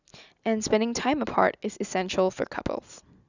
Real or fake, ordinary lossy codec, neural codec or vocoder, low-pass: real; none; none; 7.2 kHz